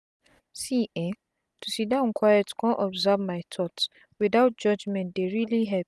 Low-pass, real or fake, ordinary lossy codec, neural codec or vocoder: none; real; none; none